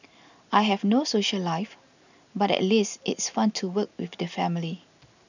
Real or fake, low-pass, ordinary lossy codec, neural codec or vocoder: real; 7.2 kHz; none; none